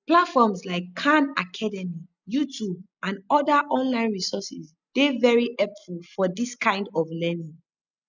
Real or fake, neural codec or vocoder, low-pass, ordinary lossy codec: real; none; 7.2 kHz; none